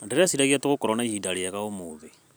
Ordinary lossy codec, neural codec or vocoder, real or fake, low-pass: none; vocoder, 44.1 kHz, 128 mel bands every 256 samples, BigVGAN v2; fake; none